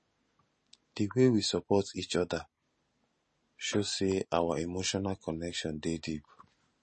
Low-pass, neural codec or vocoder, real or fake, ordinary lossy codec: 10.8 kHz; none; real; MP3, 32 kbps